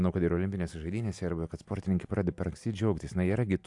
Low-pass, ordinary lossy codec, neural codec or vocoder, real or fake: 10.8 kHz; AAC, 64 kbps; none; real